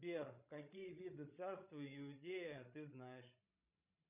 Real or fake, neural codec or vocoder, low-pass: fake; codec, 16 kHz, 16 kbps, FreqCodec, larger model; 3.6 kHz